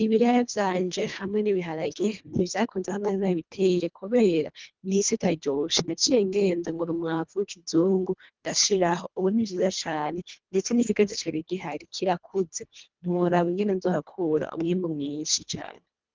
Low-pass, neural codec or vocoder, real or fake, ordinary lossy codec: 7.2 kHz; codec, 24 kHz, 1.5 kbps, HILCodec; fake; Opus, 24 kbps